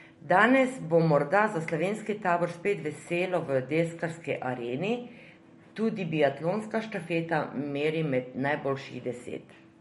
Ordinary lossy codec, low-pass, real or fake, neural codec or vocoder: MP3, 48 kbps; 19.8 kHz; real; none